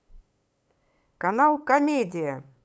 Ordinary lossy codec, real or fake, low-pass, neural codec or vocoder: none; fake; none; codec, 16 kHz, 8 kbps, FunCodec, trained on LibriTTS, 25 frames a second